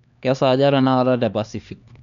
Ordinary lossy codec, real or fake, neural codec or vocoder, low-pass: none; fake; codec, 16 kHz, 2 kbps, X-Codec, HuBERT features, trained on LibriSpeech; 7.2 kHz